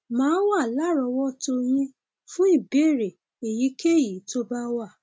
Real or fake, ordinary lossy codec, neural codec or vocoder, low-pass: real; none; none; none